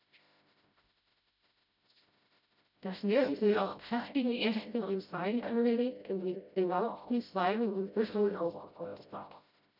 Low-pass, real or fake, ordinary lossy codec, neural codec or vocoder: 5.4 kHz; fake; none; codec, 16 kHz, 0.5 kbps, FreqCodec, smaller model